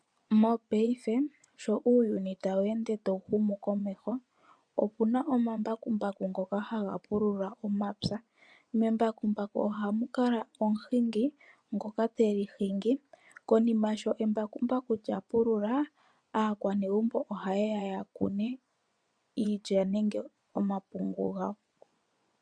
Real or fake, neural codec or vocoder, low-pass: fake; vocoder, 24 kHz, 100 mel bands, Vocos; 9.9 kHz